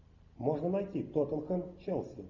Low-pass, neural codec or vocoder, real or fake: 7.2 kHz; none; real